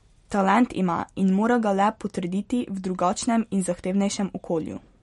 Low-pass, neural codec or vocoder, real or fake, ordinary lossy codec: 19.8 kHz; none; real; MP3, 48 kbps